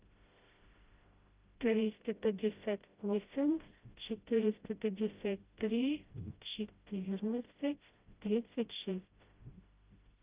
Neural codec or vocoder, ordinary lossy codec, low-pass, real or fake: codec, 16 kHz, 0.5 kbps, FreqCodec, smaller model; Opus, 24 kbps; 3.6 kHz; fake